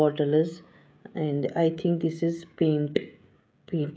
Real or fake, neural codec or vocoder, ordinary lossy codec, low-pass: fake; codec, 16 kHz, 16 kbps, FreqCodec, smaller model; none; none